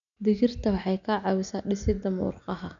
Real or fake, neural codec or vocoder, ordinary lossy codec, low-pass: real; none; none; 7.2 kHz